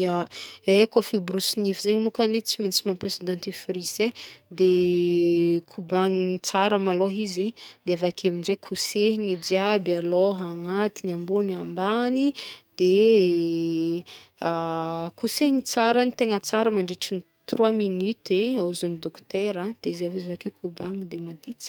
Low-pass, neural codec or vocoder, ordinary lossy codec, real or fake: none; codec, 44.1 kHz, 2.6 kbps, SNAC; none; fake